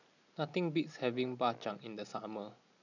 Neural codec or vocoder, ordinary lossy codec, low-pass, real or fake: none; none; 7.2 kHz; real